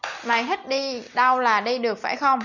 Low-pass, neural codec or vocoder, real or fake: 7.2 kHz; none; real